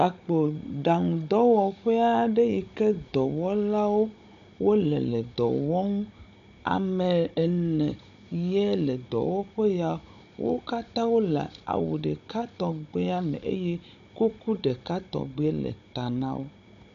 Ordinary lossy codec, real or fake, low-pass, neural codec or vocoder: MP3, 96 kbps; fake; 7.2 kHz; codec, 16 kHz, 16 kbps, FunCodec, trained on Chinese and English, 50 frames a second